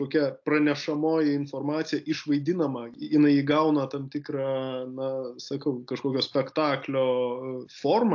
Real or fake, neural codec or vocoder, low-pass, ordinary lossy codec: real; none; 7.2 kHz; AAC, 48 kbps